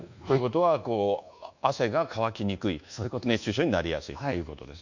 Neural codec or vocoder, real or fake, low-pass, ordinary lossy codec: codec, 24 kHz, 1.2 kbps, DualCodec; fake; 7.2 kHz; none